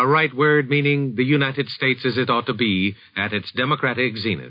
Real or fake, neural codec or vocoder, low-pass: real; none; 5.4 kHz